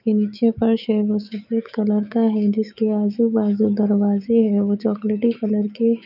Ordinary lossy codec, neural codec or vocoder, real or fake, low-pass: none; autoencoder, 48 kHz, 128 numbers a frame, DAC-VAE, trained on Japanese speech; fake; 5.4 kHz